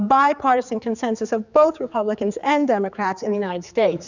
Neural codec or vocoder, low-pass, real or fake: codec, 16 kHz, 4 kbps, X-Codec, HuBERT features, trained on general audio; 7.2 kHz; fake